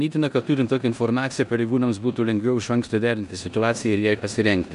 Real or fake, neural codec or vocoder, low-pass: fake; codec, 16 kHz in and 24 kHz out, 0.9 kbps, LongCat-Audio-Codec, four codebook decoder; 10.8 kHz